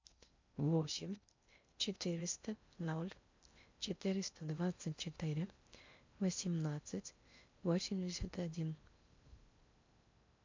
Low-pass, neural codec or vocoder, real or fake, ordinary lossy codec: 7.2 kHz; codec, 16 kHz in and 24 kHz out, 0.6 kbps, FocalCodec, streaming, 2048 codes; fake; MP3, 64 kbps